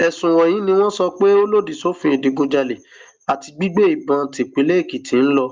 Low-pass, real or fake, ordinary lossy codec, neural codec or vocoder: 7.2 kHz; real; Opus, 32 kbps; none